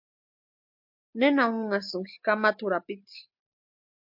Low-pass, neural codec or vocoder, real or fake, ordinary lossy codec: 5.4 kHz; none; real; MP3, 48 kbps